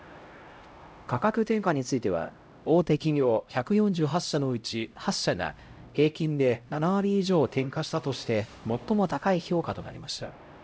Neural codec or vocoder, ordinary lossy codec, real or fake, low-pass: codec, 16 kHz, 0.5 kbps, X-Codec, HuBERT features, trained on LibriSpeech; none; fake; none